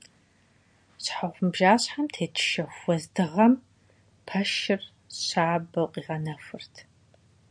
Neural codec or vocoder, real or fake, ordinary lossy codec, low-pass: none; real; MP3, 96 kbps; 9.9 kHz